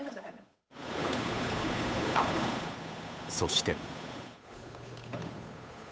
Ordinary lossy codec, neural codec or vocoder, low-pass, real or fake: none; codec, 16 kHz, 8 kbps, FunCodec, trained on Chinese and English, 25 frames a second; none; fake